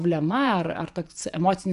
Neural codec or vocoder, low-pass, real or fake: vocoder, 24 kHz, 100 mel bands, Vocos; 10.8 kHz; fake